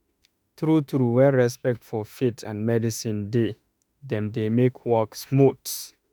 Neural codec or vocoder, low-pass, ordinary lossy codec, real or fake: autoencoder, 48 kHz, 32 numbers a frame, DAC-VAE, trained on Japanese speech; none; none; fake